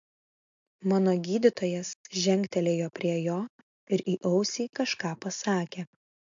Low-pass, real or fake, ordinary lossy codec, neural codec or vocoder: 7.2 kHz; real; MP3, 48 kbps; none